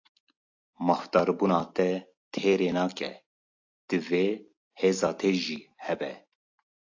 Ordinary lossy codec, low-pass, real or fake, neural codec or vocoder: AAC, 48 kbps; 7.2 kHz; real; none